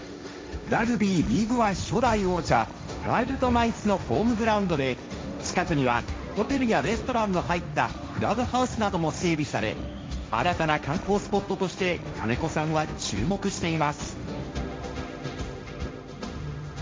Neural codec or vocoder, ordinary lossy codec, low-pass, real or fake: codec, 16 kHz, 1.1 kbps, Voila-Tokenizer; none; none; fake